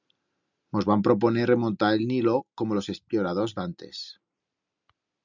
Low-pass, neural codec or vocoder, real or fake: 7.2 kHz; none; real